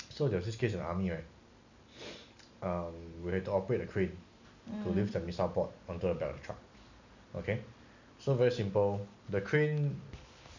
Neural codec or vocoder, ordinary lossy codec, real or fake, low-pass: none; none; real; 7.2 kHz